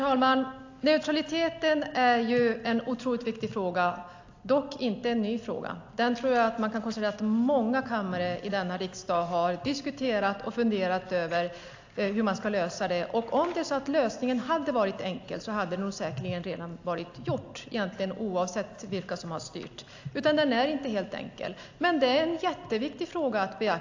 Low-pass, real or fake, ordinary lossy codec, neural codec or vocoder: 7.2 kHz; real; AAC, 48 kbps; none